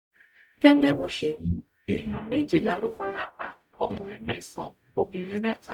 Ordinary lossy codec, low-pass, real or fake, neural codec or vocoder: none; 19.8 kHz; fake; codec, 44.1 kHz, 0.9 kbps, DAC